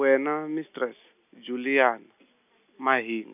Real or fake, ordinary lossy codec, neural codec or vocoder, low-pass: real; none; none; 3.6 kHz